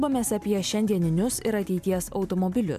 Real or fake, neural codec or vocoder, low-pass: real; none; 14.4 kHz